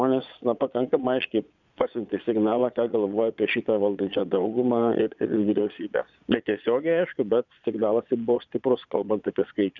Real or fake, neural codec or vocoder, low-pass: fake; vocoder, 22.05 kHz, 80 mel bands, Vocos; 7.2 kHz